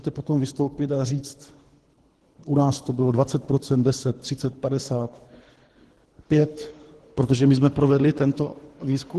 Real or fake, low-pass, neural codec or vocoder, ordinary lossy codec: fake; 10.8 kHz; codec, 24 kHz, 3 kbps, HILCodec; Opus, 16 kbps